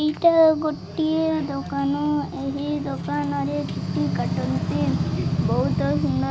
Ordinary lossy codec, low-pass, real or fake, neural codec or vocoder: none; none; real; none